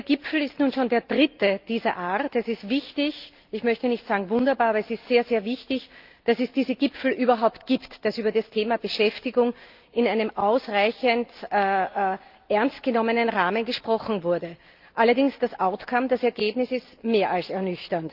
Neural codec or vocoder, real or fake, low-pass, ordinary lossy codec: none; real; 5.4 kHz; Opus, 32 kbps